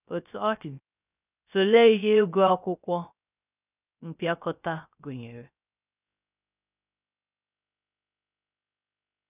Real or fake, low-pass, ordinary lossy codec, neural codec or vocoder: fake; 3.6 kHz; none; codec, 16 kHz, 0.3 kbps, FocalCodec